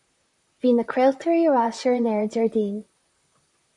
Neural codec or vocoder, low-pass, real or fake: vocoder, 44.1 kHz, 128 mel bands, Pupu-Vocoder; 10.8 kHz; fake